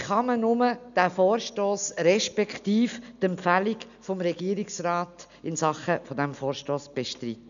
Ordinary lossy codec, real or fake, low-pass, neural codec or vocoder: none; real; 7.2 kHz; none